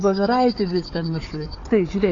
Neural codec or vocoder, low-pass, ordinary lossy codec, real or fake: codec, 16 kHz, 4 kbps, FunCodec, trained on Chinese and English, 50 frames a second; 7.2 kHz; AAC, 32 kbps; fake